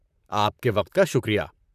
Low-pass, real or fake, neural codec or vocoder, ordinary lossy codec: 14.4 kHz; fake; vocoder, 44.1 kHz, 128 mel bands, Pupu-Vocoder; none